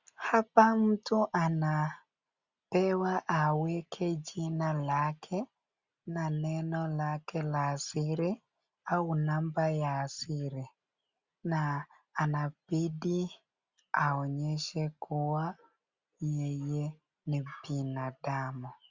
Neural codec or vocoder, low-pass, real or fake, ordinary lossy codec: none; 7.2 kHz; real; Opus, 64 kbps